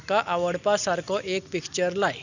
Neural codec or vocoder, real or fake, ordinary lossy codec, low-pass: none; real; none; 7.2 kHz